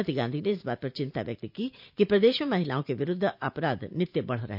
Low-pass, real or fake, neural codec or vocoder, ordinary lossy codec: 5.4 kHz; fake; vocoder, 44.1 kHz, 128 mel bands every 512 samples, BigVGAN v2; none